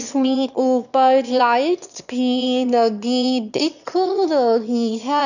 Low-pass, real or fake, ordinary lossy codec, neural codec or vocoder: 7.2 kHz; fake; none; autoencoder, 22.05 kHz, a latent of 192 numbers a frame, VITS, trained on one speaker